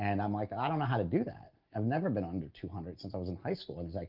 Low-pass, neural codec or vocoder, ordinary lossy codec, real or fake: 5.4 kHz; none; Opus, 32 kbps; real